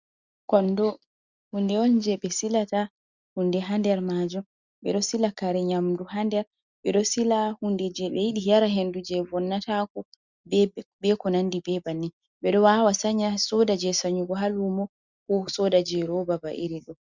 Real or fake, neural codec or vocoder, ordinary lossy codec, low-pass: real; none; Opus, 64 kbps; 7.2 kHz